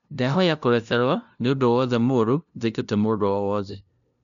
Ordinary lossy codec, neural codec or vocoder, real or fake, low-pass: none; codec, 16 kHz, 0.5 kbps, FunCodec, trained on LibriTTS, 25 frames a second; fake; 7.2 kHz